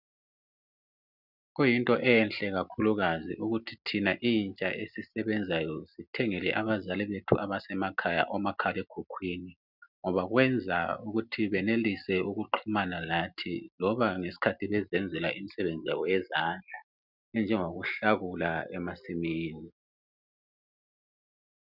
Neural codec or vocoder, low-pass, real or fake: none; 5.4 kHz; real